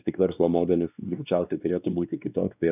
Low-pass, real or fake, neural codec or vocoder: 3.6 kHz; fake; codec, 16 kHz, 2 kbps, X-Codec, WavLM features, trained on Multilingual LibriSpeech